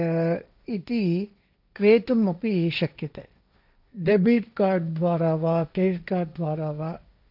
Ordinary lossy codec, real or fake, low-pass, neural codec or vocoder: none; fake; 5.4 kHz; codec, 16 kHz, 1.1 kbps, Voila-Tokenizer